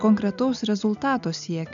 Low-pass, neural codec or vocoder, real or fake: 7.2 kHz; none; real